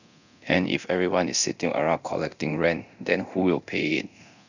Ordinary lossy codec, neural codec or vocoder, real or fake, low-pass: none; codec, 24 kHz, 0.9 kbps, DualCodec; fake; 7.2 kHz